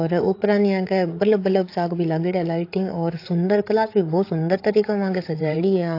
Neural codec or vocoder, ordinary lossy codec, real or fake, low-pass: codec, 16 kHz, 8 kbps, FreqCodec, larger model; AAC, 32 kbps; fake; 5.4 kHz